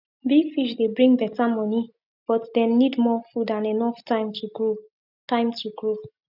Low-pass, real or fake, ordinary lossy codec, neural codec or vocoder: 5.4 kHz; real; none; none